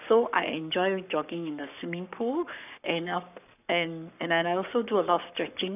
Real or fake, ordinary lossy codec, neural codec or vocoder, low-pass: fake; none; codec, 44.1 kHz, 7.8 kbps, Pupu-Codec; 3.6 kHz